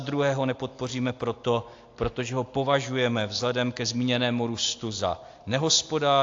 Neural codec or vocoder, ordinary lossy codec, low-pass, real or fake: none; AAC, 48 kbps; 7.2 kHz; real